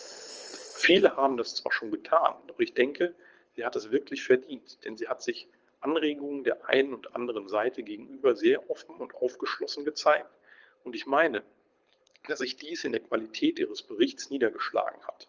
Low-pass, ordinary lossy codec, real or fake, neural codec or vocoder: 7.2 kHz; Opus, 24 kbps; fake; codec, 24 kHz, 6 kbps, HILCodec